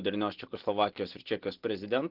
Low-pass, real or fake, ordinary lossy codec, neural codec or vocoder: 5.4 kHz; real; Opus, 16 kbps; none